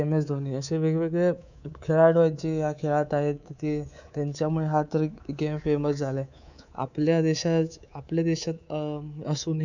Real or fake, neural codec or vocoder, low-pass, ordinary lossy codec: fake; codec, 24 kHz, 3.1 kbps, DualCodec; 7.2 kHz; none